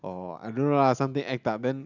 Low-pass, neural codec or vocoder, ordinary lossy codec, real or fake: 7.2 kHz; none; none; real